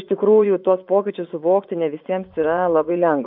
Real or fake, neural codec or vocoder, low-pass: fake; vocoder, 24 kHz, 100 mel bands, Vocos; 5.4 kHz